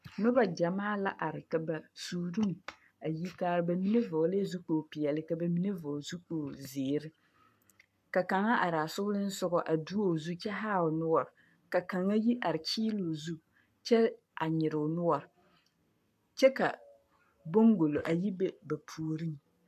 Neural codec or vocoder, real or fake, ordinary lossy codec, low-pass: codec, 44.1 kHz, 7.8 kbps, Pupu-Codec; fake; AAC, 96 kbps; 14.4 kHz